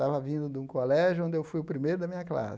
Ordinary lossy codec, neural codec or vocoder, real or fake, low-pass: none; none; real; none